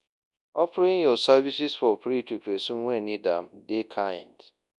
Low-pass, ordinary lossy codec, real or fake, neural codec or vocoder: 10.8 kHz; none; fake; codec, 24 kHz, 0.9 kbps, WavTokenizer, large speech release